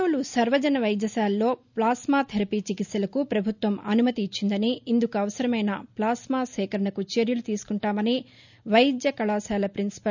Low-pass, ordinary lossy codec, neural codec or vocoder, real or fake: 7.2 kHz; none; none; real